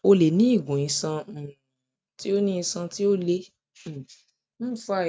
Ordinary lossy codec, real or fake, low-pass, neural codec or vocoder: none; real; none; none